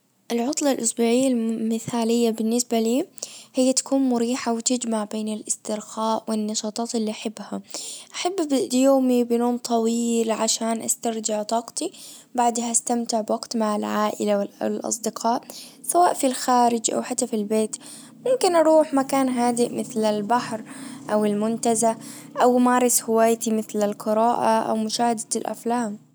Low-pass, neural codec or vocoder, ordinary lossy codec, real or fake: none; none; none; real